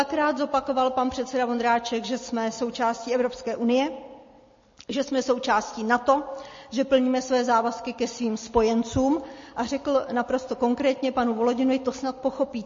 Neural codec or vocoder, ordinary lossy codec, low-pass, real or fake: none; MP3, 32 kbps; 7.2 kHz; real